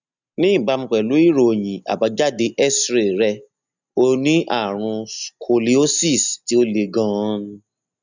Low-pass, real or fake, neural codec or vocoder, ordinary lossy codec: 7.2 kHz; real; none; none